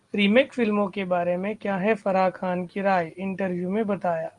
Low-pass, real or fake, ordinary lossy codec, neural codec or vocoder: 10.8 kHz; real; Opus, 24 kbps; none